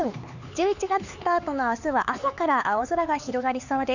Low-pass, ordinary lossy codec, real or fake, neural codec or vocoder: 7.2 kHz; none; fake; codec, 16 kHz, 4 kbps, X-Codec, HuBERT features, trained on LibriSpeech